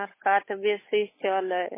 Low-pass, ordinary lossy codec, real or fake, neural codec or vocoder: 3.6 kHz; MP3, 16 kbps; fake; codec, 16 kHz, 8 kbps, FunCodec, trained on Chinese and English, 25 frames a second